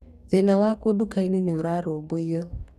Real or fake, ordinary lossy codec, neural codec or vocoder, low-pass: fake; none; codec, 44.1 kHz, 2.6 kbps, DAC; 14.4 kHz